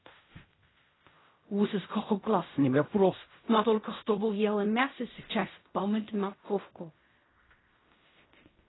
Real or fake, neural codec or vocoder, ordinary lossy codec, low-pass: fake; codec, 16 kHz in and 24 kHz out, 0.4 kbps, LongCat-Audio-Codec, fine tuned four codebook decoder; AAC, 16 kbps; 7.2 kHz